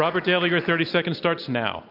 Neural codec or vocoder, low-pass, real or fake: none; 5.4 kHz; real